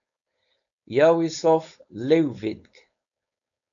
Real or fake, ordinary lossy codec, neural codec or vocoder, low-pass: fake; MP3, 96 kbps; codec, 16 kHz, 4.8 kbps, FACodec; 7.2 kHz